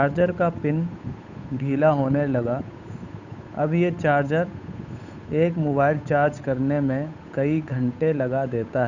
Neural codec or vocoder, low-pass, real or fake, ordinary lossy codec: codec, 16 kHz, 8 kbps, FunCodec, trained on Chinese and English, 25 frames a second; 7.2 kHz; fake; none